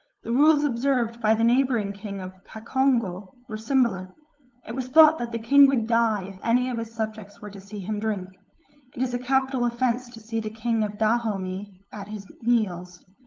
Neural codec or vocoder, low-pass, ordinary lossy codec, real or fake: codec, 16 kHz, 8 kbps, FunCodec, trained on LibriTTS, 25 frames a second; 7.2 kHz; Opus, 24 kbps; fake